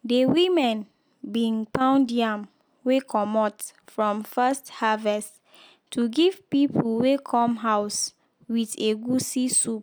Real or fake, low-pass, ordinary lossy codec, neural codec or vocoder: real; none; none; none